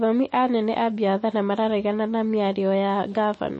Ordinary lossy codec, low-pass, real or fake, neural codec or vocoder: MP3, 32 kbps; 10.8 kHz; real; none